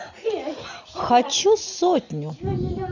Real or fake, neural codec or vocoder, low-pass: real; none; 7.2 kHz